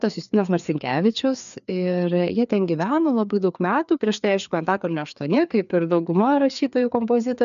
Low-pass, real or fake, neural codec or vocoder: 7.2 kHz; fake; codec, 16 kHz, 2 kbps, FreqCodec, larger model